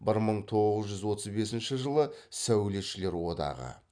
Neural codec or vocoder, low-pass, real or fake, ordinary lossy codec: none; 9.9 kHz; real; none